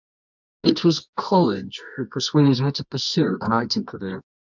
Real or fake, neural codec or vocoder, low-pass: fake; codec, 24 kHz, 0.9 kbps, WavTokenizer, medium music audio release; 7.2 kHz